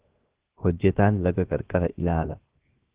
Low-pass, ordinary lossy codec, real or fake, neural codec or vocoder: 3.6 kHz; Opus, 16 kbps; fake; codec, 16 kHz, 0.7 kbps, FocalCodec